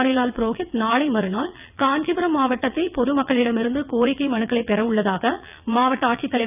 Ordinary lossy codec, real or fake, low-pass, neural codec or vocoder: none; fake; 3.6 kHz; vocoder, 22.05 kHz, 80 mel bands, WaveNeXt